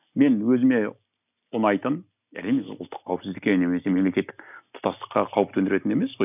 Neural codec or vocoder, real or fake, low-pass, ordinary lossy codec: none; real; 3.6 kHz; none